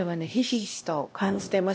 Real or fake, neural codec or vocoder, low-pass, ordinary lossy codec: fake; codec, 16 kHz, 1 kbps, X-Codec, HuBERT features, trained on LibriSpeech; none; none